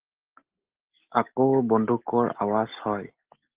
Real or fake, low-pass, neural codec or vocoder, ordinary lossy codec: real; 3.6 kHz; none; Opus, 16 kbps